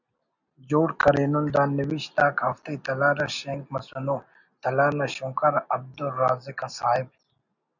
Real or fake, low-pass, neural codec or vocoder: real; 7.2 kHz; none